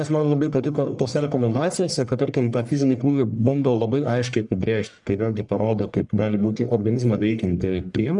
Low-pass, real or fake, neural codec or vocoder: 10.8 kHz; fake; codec, 44.1 kHz, 1.7 kbps, Pupu-Codec